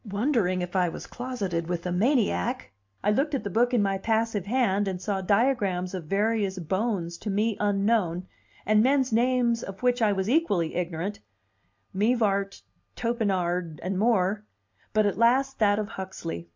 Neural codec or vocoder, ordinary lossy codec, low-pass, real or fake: none; MP3, 64 kbps; 7.2 kHz; real